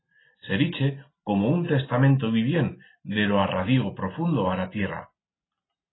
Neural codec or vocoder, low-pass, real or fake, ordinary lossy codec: none; 7.2 kHz; real; AAC, 16 kbps